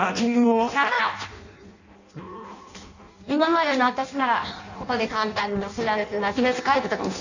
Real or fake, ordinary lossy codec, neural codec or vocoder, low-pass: fake; none; codec, 16 kHz in and 24 kHz out, 0.6 kbps, FireRedTTS-2 codec; 7.2 kHz